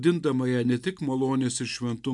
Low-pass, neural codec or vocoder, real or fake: 10.8 kHz; none; real